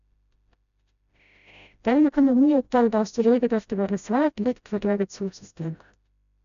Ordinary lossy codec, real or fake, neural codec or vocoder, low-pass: none; fake; codec, 16 kHz, 0.5 kbps, FreqCodec, smaller model; 7.2 kHz